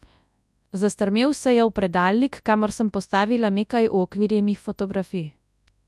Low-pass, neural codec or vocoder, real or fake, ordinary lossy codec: none; codec, 24 kHz, 0.9 kbps, WavTokenizer, large speech release; fake; none